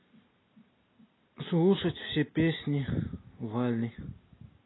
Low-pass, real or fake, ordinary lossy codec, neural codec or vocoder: 7.2 kHz; real; AAC, 16 kbps; none